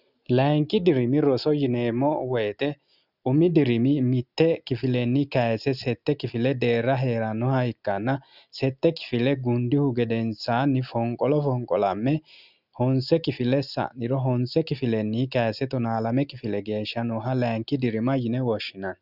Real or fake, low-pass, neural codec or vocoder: real; 5.4 kHz; none